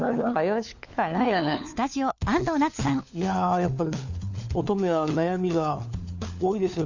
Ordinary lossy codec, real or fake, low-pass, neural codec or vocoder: none; fake; 7.2 kHz; codec, 16 kHz, 4 kbps, FunCodec, trained on LibriTTS, 50 frames a second